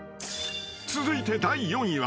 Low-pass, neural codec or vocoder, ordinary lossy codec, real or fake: none; none; none; real